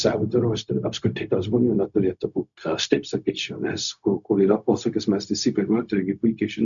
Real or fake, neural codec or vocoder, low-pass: fake; codec, 16 kHz, 0.4 kbps, LongCat-Audio-Codec; 7.2 kHz